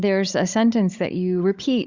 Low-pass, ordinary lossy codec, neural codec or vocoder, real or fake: 7.2 kHz; Opus, 64 kbps; codec, 16 kHz, 16 kbps, FunCodec, trained on Chinese and English, 50 frames a second; fake